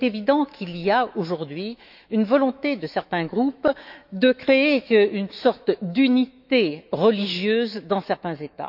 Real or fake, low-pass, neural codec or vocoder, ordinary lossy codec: fake; 5.4 kHz; autoencoder, 48 kHz, 128 numbers a frame, DAC-VAE, trained on Japanese speech; none